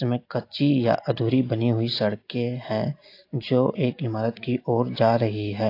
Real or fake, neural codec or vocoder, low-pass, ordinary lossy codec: real; none; 5.4 kHz; AAC, 32 kbps